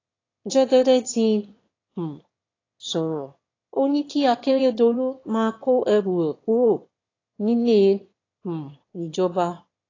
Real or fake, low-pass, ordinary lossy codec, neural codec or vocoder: fake; 7.2 kHz; AAC, 32 kbps; autoencoder, 22.05 kHz, a latent of 192 numbers a frame, VITS, trained on one speaker